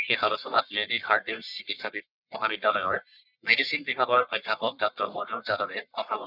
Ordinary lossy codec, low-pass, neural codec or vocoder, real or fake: none; 5.4 kHz; codec, 44.1 kHz, 1.7 kbps, Pupu-Codec; fake